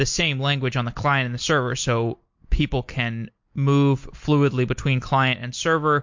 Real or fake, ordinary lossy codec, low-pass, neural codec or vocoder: real; MP3, 48 kbps; 7.2 kHz; none